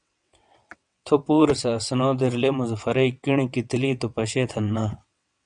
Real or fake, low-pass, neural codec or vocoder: fake; 9.9 kHz; vocoder, 22.05 kHz, 80 mel bands, WaveNeXt